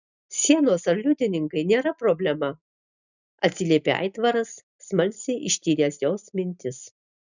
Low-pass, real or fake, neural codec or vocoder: 7.2 kHz; real; none